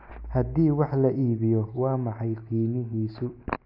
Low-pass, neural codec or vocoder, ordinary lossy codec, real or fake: 5.4 kHz; none; AAC, 32 kbps; real